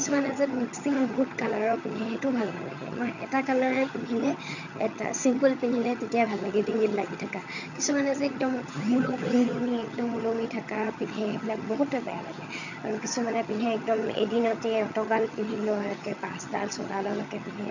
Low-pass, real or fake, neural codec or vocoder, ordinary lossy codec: 7.2 kHz; fake; vocoder, 22.05 kHz, 80 mel bands, HiFi-GAN; none